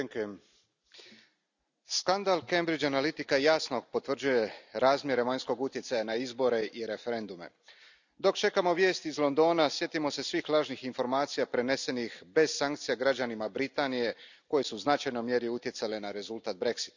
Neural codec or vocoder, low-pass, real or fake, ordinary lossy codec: none; 7.2 kHz; real; none